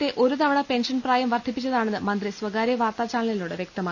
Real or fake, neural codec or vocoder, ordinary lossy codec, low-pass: real; none; none; 7.2 kHz